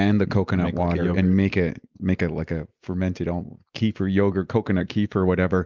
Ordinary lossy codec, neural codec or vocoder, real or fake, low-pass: Opus, 24 kbps; none; real; 7.2 kHz